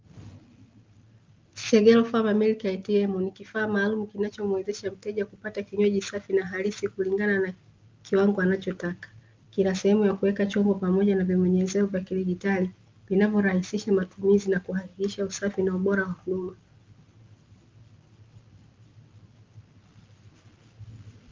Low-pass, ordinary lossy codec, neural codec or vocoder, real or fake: 7.2 kHz; Opus, 24 kbps; none; real